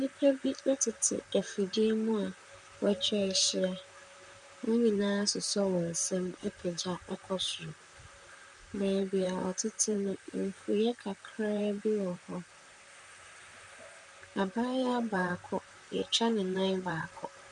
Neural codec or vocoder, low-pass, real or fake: vocoder, 44.1 kHz, 128 mel bands, Pupu-Vocoder; 10.8 kHz; fake